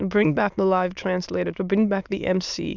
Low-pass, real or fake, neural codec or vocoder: 7.2 kHz; fake; autoencoder, 22.05 kHz, a latent of 192 numbers a frame, VITS, trained on many speakers